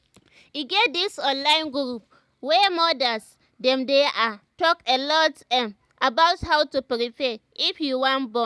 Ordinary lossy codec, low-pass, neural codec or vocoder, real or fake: none; none; none; real